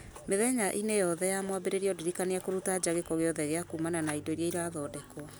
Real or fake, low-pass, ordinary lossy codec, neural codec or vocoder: real; none; none; none